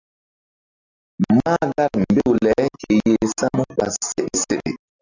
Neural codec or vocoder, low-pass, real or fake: none; 7.2 kHz; real